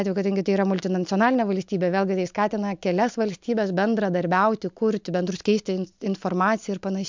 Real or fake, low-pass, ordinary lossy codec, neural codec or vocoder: real; 7.2 kHz; MP3, 64 kbps; none